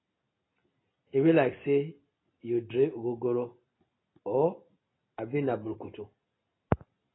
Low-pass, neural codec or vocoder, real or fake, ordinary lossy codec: 7.2 kHz; none; real; AAC, 16 kbps